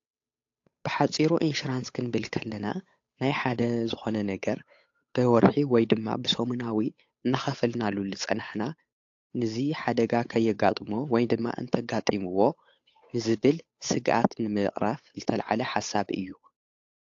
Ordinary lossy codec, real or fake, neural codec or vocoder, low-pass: AAC, 48 kbps; fake; codec, 16 kHz, 8 kbps, FunCodec, trained on Chinese and English, 25 frames a second; 7.2 kHz